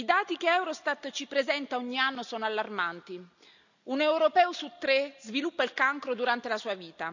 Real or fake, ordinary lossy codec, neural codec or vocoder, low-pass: real; none; none; 7.2 kHz